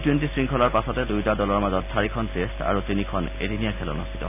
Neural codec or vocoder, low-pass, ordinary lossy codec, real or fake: none; 3.6 kHz; none; real